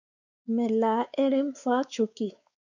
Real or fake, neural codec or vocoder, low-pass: fake; codec, 16 kHz, 4 kbps, X-Codec, WavLM features, trained on Multilingual LibriSpeech; 7.2 kHz